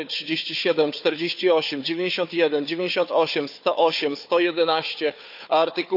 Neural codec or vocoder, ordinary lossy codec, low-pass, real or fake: codec, 16 kHz, 4 kbps, FunCodec, trained on Chinese and English, 50 frames a second; none; 5.4 kHz; fake